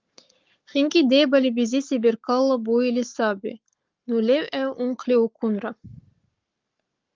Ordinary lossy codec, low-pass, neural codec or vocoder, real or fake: Opus, 32 kbps; 7.2 kHz; codec, 44.1 kHz, 7.8 kbps, Pupu-Codec; fake